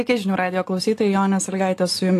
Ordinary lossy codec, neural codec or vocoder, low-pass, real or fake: AAC, 48 kbps; none; 14.4 kHz; real